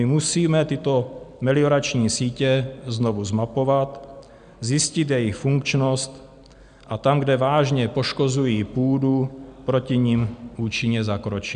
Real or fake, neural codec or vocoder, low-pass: real; none; 9.9 kHz